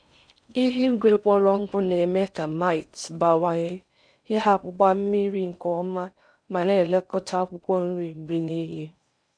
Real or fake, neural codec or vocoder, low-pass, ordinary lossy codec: fake; codec, 16 kHz in and 24 kHz out, 0.6 kbps, FocalCodec, streaming, 4096 codes; 9.9 kHz; none